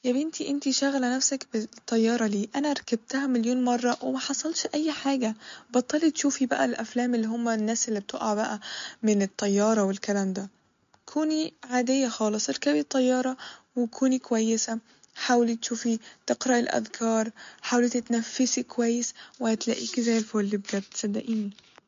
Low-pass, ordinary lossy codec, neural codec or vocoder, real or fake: 7.2 kHz; MP3, 48 kbps; none; real